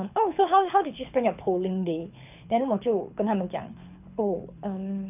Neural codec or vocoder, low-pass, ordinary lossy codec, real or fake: codec, 24 kHz, 6 kbps, HILCodec; 3.6 kHz; none; fake